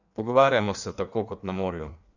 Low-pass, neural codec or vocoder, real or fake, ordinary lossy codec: 7.2 kHz; codec, 16 kHz in and 24 kHz out, 1.1 kbps, FireRedTTS-2 codec; fake; none